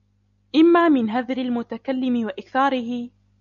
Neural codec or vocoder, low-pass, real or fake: none; 7.2 kHz; real